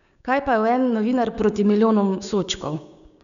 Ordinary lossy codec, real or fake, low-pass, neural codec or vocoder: none; fake; 7.2 kHz; codec, 16 kHz, 6 kbps, DAC